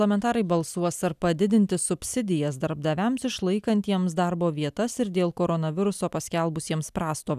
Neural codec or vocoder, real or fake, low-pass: none; real; 14.4 kHz